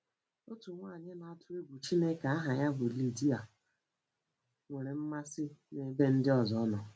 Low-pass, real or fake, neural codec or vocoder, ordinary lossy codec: none; real; none; none